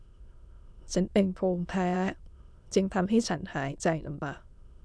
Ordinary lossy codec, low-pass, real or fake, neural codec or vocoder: none; none; fake; autoencoder, 22.05 kHz, a latent of 192 numbers a frame, VITS, trained on many speakers